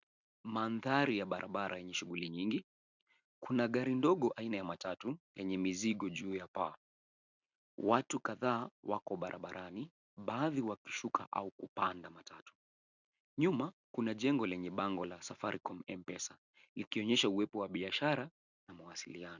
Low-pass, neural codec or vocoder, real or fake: 7.2 kHz; none; real